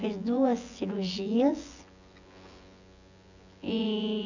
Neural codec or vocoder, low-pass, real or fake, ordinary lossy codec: vocoder, 24 kHz, 100 mel bands, Vocos; 7.2 kHz; fake; none